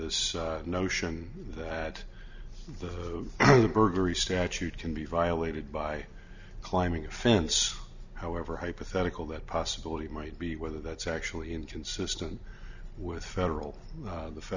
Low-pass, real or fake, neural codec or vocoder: 7.2 kHz; real; none